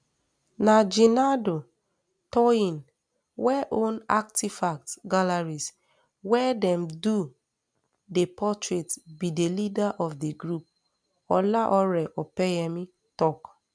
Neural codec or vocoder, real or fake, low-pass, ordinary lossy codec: none; real; 9.9 kHz; none